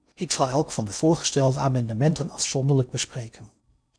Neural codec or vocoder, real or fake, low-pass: codec, 16 kHz in and 24 kHz out, 0.6 kbps, FocalCodec, streaming, 4096 codes; fake; 9.9 kHz